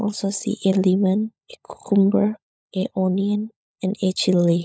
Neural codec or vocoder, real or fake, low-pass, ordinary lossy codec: codec, 16 kHz, 16 kbps, FunCodec, trained on LibriTTS, 50 frames a second; fake; none; none